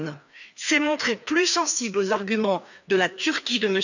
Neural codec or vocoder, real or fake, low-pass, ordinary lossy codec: codec, 16 kHz, 2 kbps, FreqCodec, larger model; fake; 7.2 kHz; none